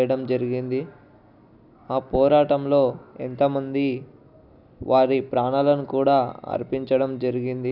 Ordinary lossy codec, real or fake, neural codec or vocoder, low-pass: none; real; none; 5.4 kHz